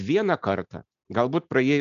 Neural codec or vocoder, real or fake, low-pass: none; real; 7.2 kHz